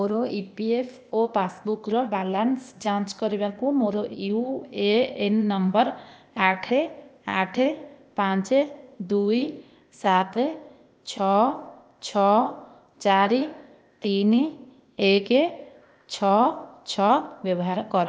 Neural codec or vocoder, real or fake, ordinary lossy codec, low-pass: codec, 16 kHz, 0.8 kbps, ZipCodec; fake; none; none